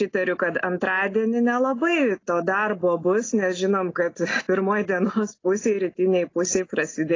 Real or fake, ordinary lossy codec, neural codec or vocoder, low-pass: real; AAC, 32 kbps; none; 7.2 kHz